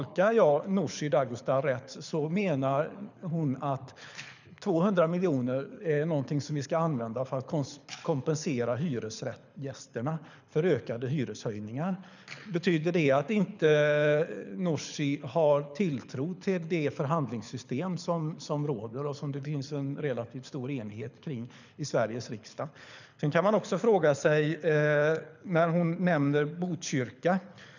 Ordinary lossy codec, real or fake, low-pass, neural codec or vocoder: none; fake; 7.2 kHz; codec, 24 kHz, 6 kbps, HILCodec